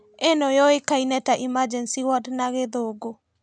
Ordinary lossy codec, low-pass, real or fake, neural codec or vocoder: none; 9.9 kHz; real; none